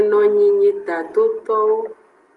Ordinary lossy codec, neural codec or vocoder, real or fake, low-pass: Opus, 32 kbps; none; real; 10.8 kHz